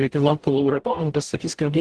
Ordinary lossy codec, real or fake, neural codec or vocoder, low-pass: Opus, 16 kbps; fake; codec, 44.1 kHz, 0.9 kbps, DAC; 10.8 kHz